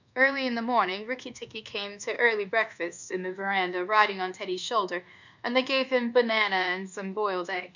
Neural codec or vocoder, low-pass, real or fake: codec, 24 kHz, 1.2 kbps, DualCodec; 7.2 kHz; fake